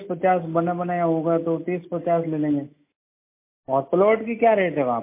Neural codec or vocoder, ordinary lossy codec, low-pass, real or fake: none; MP3, 24 kbps; 3.6 kHz; real